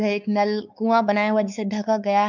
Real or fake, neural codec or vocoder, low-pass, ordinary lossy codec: fake; codec, 16 kHz, 4 kbps, X-Codec, WavLM features, trained on Multilingual LibriSpeech; 7.2 kHz; none